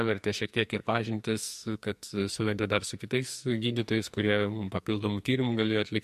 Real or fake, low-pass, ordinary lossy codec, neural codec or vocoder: fake; 14.4 kHz; MP3, 64 kbps; codec, 44.1 kHz, 2.6 kbps, SNAC